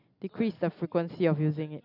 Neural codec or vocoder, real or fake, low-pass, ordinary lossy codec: none; real; 5.4 kHz; none